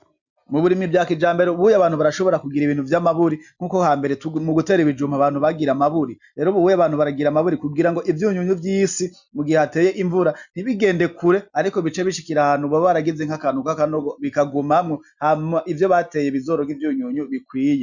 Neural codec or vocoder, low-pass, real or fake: none; 7.2 kHz; real